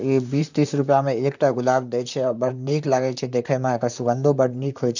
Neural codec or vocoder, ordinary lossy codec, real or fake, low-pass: vocoder, 44.1 kHz, 128 mel bands, Pupu-Vocoder; none; fake; 7.2 kHz